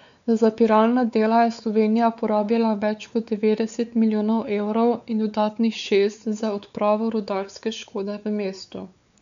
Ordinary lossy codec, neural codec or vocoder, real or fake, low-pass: MP3, 96 kbps; codec, 16 kHz, 4 kbps, X-Codec, WavLM features, trained on Multilingual LibriSpeech; fake; 7.2 kHz